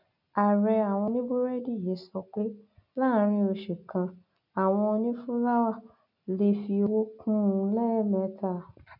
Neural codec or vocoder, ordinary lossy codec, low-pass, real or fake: none; none; 5.4 kHz; real